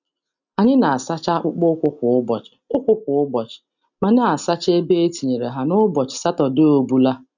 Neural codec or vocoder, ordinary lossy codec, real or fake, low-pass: none; none; real; 7.2 kHz